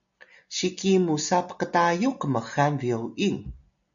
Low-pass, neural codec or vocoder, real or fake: 7.2 kHz; none; real